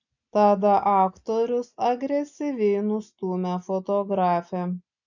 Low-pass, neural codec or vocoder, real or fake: 7.2 kHz; none; real